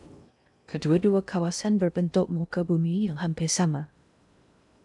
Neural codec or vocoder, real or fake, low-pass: codec, 16 kHz in and 24 kHz out, 0.6 kbps, FocalCodec, streaming, 2048 codes; fake; 10.8 kHz